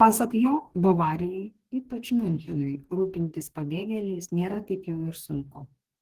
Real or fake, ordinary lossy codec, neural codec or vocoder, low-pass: fake; Opus, 16 kbps; codec, 44.1 kHz, 2.6 kbps, DAC; 14.4 kHz